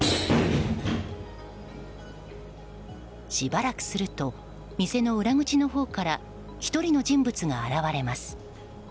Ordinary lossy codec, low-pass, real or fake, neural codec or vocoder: none; none; real; none